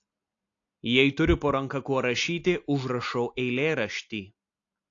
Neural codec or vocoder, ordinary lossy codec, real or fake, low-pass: none; AAC, 48 kbps; real; 7.2 kHz